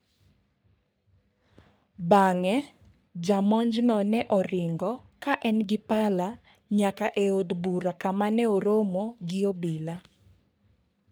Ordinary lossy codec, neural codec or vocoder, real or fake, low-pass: none; codec, 44.1 kHz, 3.4 kbps, Pupu-Codec; fake; none